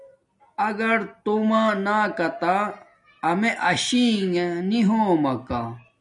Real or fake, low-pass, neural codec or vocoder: real; 10.8 kHz; none